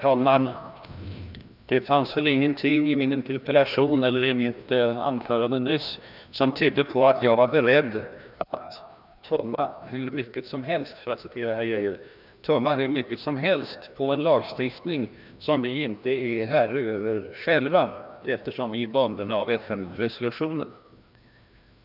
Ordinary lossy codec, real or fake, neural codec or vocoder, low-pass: none; fake; codec, 16 kHz, 1 kbps, FreqCodec, larger model; 5.4 kHz